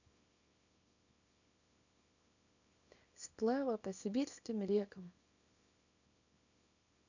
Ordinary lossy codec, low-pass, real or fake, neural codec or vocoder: none; 7.2 kHz; fake; codec, 24 kHz, 0.9 kbps, WavTokenizer, small release